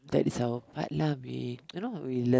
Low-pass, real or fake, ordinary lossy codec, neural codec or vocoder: none; real; none; none